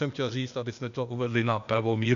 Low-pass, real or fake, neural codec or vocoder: 7.2 kHz; fake; codec, 16 kHz, 0.8 kbps, ZipCodec